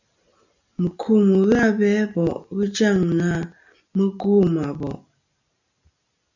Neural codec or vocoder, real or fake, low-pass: none; real; 7.2 kHz